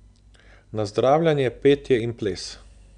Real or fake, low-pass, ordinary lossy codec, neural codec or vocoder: real; 9.9 kHz; none; none